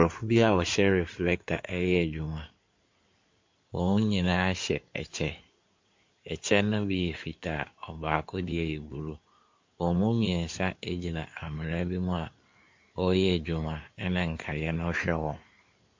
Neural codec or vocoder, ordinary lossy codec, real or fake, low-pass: codec, 16 kHz in and 24 kHz out, 2.2 kbps, FireRedTTS-2 codec; MP3, 48 kbps; fake; 7.2 kHz